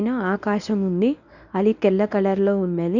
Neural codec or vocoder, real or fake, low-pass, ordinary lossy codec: codec, 24 kHz, 0.9 kbps, WavTokenizer, medium speech release version 1; fake; 7.2 kHz; none